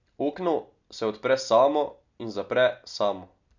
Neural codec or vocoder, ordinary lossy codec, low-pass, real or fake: none; none; 7.2 kHz; real